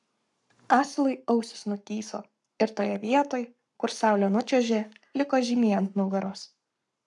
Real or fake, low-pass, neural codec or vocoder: fake; 10.8 kHz; codec, 44.1 kHz, 7.8 kbps, Pupu-Codec